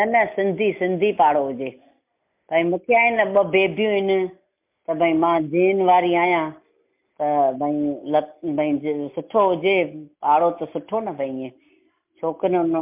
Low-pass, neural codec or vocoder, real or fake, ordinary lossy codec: 3.6 kHz; none; real; MP3, 32 kbps